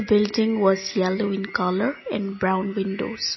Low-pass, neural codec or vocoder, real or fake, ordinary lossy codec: 7.2 kHz; none; real; MP3, 24 kbps